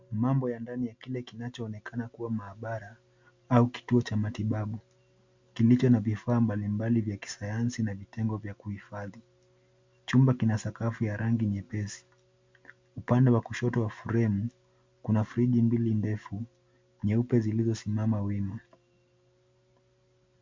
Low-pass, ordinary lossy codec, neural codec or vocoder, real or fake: 7.2 kHz; MP3, 64 kbps; none; real